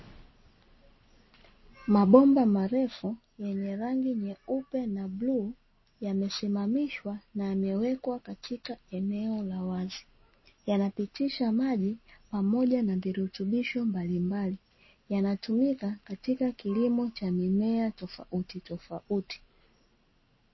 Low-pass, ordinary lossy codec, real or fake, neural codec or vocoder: 7.2 kHz; MP3, 24 kbps; real; none